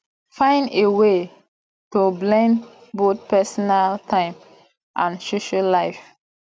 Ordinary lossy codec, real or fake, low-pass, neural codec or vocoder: none; real; none; none